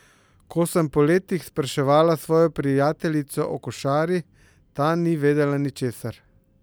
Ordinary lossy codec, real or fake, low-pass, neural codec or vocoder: none; real; none; none